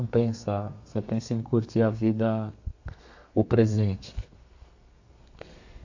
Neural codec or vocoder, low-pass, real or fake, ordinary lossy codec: codec, 32 kHz, 1.9 kbps, SNAC; 7.2 kHz; fake; AAC, 48 kbps